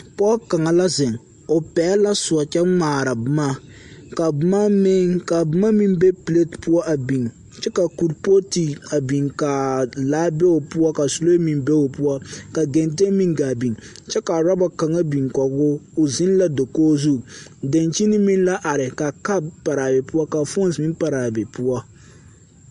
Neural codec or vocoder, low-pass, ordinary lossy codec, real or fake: none; 14.4 kHz; MP3, 48 kbps; real